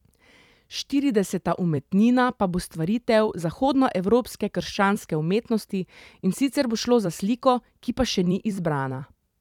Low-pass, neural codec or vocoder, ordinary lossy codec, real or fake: 19.8 kHz; vocoder, 44.1 kHz, 128 mel bands every 256 samples, BigVGAN v2; none; fake